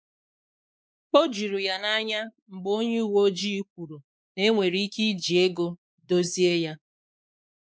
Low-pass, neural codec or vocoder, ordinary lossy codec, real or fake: none; codec, 16 kHz, 4 kbps, X-Codec, WavLM features, trained on Multilingual LibriSpeech; none; fake